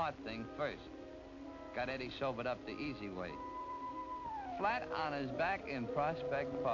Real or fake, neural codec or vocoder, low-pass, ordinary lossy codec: real; none; 7.2 kHz; AAC, 48 kbps